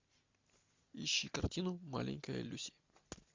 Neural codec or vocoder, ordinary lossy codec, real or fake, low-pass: none; MP3, 64 kbps; real; 7.2 kHz